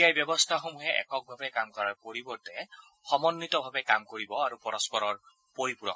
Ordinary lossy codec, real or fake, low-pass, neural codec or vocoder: none; real; none; none